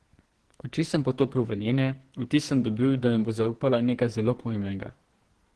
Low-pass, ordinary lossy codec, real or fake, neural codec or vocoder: 10.8 kHz; Opus, 16 kbps; fake; codec, 44.1 kHz, 2.6 kbps, SNAC